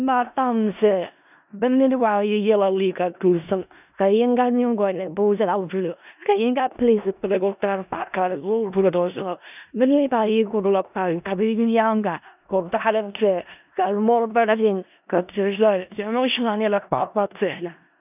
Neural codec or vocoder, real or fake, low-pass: codec, 16 kHz in and 24 kHz out, 0.4 kbps, LongCat-Audio-Codec, four codebook decoder; fake; 3.6 kHz